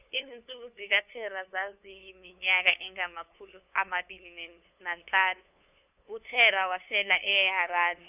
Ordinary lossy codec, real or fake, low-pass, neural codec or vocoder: none; fake; 3.6 kHz; codec, 16 kHz, 2 kbps, FunCodec, trained on LibriTTS, 25 frames a second